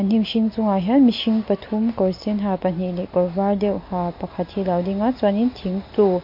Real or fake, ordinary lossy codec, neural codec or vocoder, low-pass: real; MP3, 32 kbps; none; 5.4 kHz